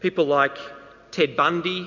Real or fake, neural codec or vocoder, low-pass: real; none; 7.2 kHz